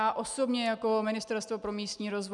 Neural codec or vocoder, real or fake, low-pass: none; real; 10.8 kHz